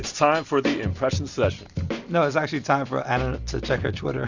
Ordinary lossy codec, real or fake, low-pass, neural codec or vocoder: Opus, 64 kbps; real; 7.2 kHz; none